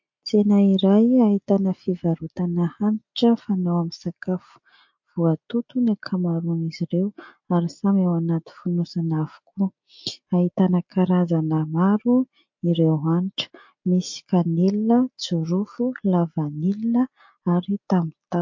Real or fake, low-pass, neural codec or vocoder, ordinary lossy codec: real; 7.2 kHz; none; MP3, 48 kbps